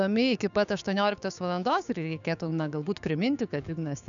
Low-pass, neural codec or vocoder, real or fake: 7.2 kHz; codec, 16 kHz, 6 kbps, DAC; fake